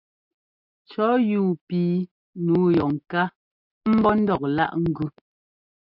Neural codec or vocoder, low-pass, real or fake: none; 5.4 kHz; real